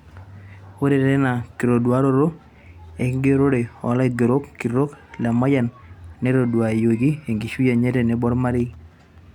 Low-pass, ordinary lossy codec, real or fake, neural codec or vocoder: 19.8 kHz; none; real; none